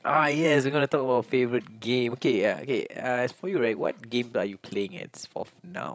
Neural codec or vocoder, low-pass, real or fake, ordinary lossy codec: codec, 16 kHz, 16 kbps, FreqCodec, larger model; none; fake; none